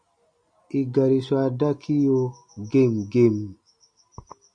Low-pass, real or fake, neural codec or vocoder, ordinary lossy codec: 9.9 kHz; real; none; MP3, 96 kbps